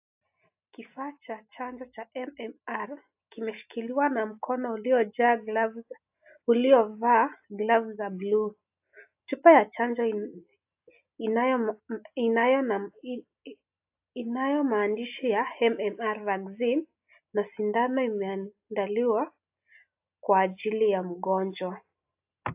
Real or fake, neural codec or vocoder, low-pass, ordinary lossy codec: real; none; 3.6 kHz; AAC, 32 kbps